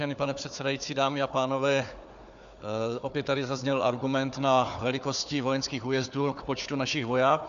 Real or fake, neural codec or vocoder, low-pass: fake; codec, 16 kHz, 4 kbps, FunCodec, trained on Chinese and English, 50 frames a second; 7.2 kHz